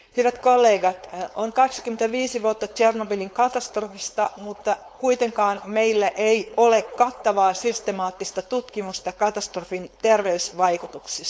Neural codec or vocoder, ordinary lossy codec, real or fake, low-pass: codec, 16 kHz, 4.8 kbps, FACodec; none; fake; none